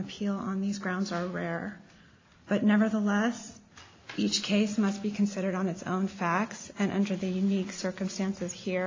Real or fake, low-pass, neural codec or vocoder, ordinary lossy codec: real; 7.2 kHz; none; AAC, 32 kbps